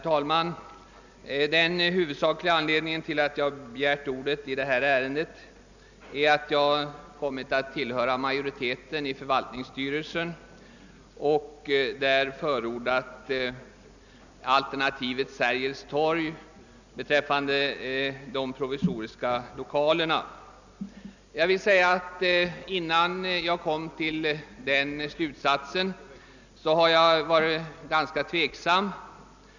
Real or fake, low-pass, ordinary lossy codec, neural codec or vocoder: real; 7.2 kHz; none; none